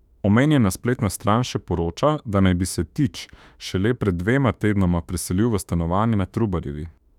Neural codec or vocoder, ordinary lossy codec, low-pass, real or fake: autoencoder, 48 kHz, 32 numbers a frame, DAC-VAE, trained on Japanese speech; none; 19.8 kHz; fake